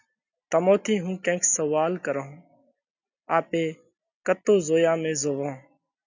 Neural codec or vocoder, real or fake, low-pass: none; real; 7.2 kHz